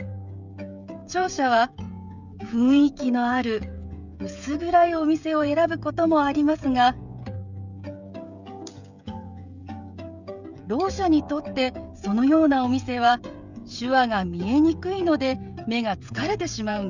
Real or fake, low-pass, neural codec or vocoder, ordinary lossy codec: fake; 7.2 kHz; codec, 16 kHz, 16 kbps, FreqCodec, smaller model; none